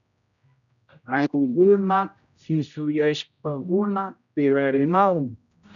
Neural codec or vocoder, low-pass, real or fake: codec, 16 kHz, 0.5 kbps, X-Codec, HuBERT features, trained on general audio; 7.2 kHz; fake